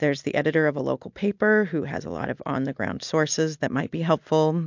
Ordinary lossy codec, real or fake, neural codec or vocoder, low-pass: MP3, 64 kbps; real; none; 7.2 kHz